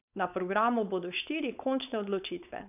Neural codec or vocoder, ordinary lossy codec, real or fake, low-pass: codec, 16 kHz, 4.8 kbps, FACodec; none; fake; 3.6 kHz